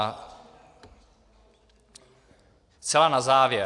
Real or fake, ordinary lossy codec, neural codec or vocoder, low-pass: real; AAC, 64 kbps; none; 10.8 kHz